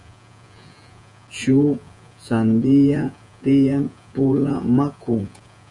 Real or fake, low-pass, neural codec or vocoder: fake; 10.8 kHz; vocoder, 48 kHz, 128 mel bands, Vocos